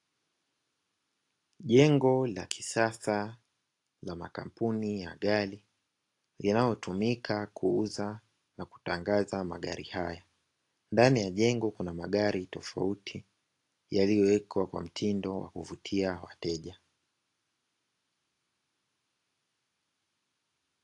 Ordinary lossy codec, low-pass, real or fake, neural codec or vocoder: AAC, 48 kbps; 10.8 kHz; real; none